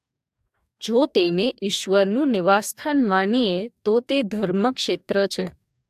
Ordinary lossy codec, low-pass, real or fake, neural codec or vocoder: AAC, 96 kbps; 14.4 kHz; fake; codec, 44.1 kHz, 2.6 kbps, DAC